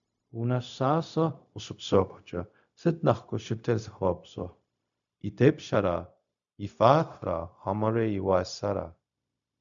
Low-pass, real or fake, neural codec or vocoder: 7.2 kHz; fake; codec, 16 kHz, 0.4 kbps, LongCat-Audio-Codec